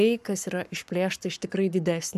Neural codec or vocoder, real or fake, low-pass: codec, 44.1 kHz, 7.8 kbps, Pupu-Codec; fake; 14.4 kHz